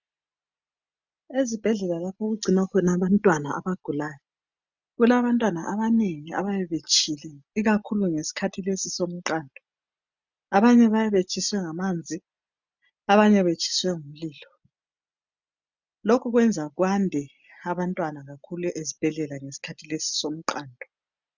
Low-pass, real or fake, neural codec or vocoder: 7.2 kHz; real; none